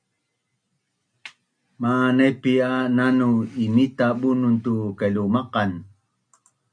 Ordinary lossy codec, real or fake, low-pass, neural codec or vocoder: MP3, 96 kbps; real; 9.9 kHz; none